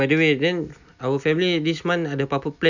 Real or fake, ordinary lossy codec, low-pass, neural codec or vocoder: real; none; 7.2 kHz; none